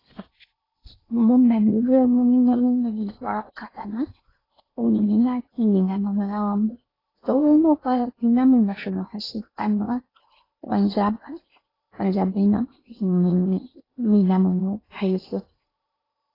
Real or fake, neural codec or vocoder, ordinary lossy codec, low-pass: fake; codec, 16 kHz in and 24 kHz out, 0.8 kbps, FocalCodec, streaming, 65536 codes; AAC, 24 kbps; 5.4 kHz